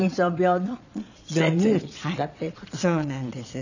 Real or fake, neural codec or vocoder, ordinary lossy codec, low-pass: fake; vocoder, 22.05 kHz, 80 mel bands, Vocos; none; 7.2 kHz